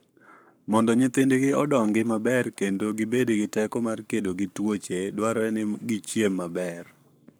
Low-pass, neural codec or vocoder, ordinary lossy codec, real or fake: none; codec, 44.1 kHz, 7.8 kbps, Pupu-Codec; none; fake